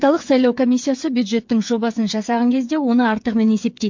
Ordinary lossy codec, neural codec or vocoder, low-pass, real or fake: MP3, 48 kbps; codec, 16 kHz, 8 kbps, FreqCodec, smaller model; 7.2 kHz; fake